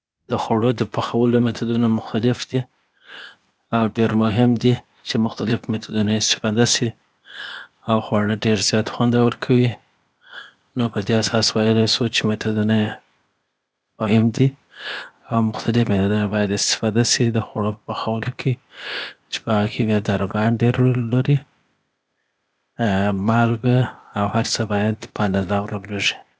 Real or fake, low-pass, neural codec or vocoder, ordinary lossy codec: fake; none; codec, 16 kHz, 0.8 kbps, ZipCodec; none